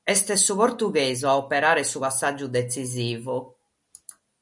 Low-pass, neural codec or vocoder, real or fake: 10.8 kHz; none; real